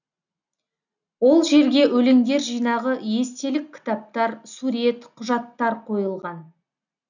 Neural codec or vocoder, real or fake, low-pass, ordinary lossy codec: none; real; 7.2 kHz; none